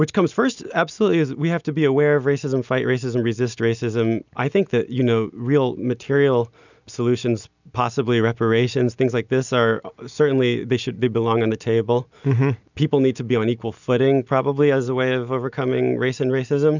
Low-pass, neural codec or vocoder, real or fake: 7.2 kHz; none; real